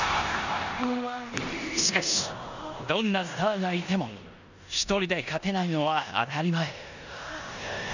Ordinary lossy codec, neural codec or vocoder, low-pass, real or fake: none; codec, 16 kHz in and 24 kHz out, 0.9 kbps, LongCat-Audio-Codec, four codebook decoder; 7.2 kHz; fake